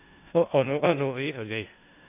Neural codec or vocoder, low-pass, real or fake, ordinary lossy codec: codec, 16 kHz in and 24 kHz out, 0.4 kbps, LongCat-Audio-Codec, four codebook decoder; 3.6 kHz; fake; AAC, 24 kbps